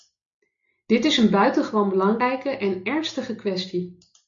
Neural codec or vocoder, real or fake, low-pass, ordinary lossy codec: none; real; 7.2 kHz; MP3, 48 kbps